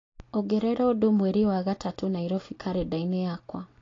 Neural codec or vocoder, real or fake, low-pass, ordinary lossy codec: none; real; 7.2 kHz; AAC, 32 kbps